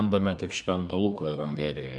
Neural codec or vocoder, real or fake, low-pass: codec, 24 kHz, 1 kbps, SNAC; fake; 10.8 kHz